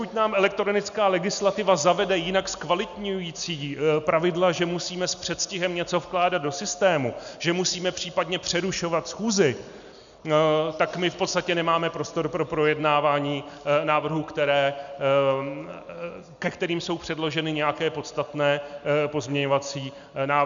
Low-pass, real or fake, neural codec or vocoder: 7.2 kHz; real; none